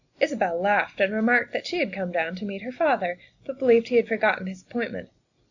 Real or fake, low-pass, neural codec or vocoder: real; 7.2 kHz; none